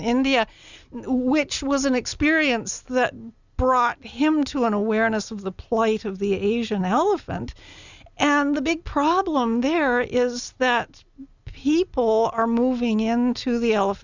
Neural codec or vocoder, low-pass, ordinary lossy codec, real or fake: none; 7.2 kHz; Opus, 64 kbps; real